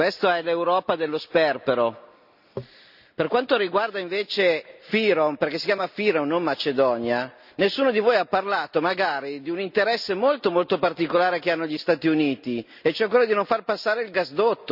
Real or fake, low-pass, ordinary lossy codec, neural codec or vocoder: real; 5.4 kHz; none; none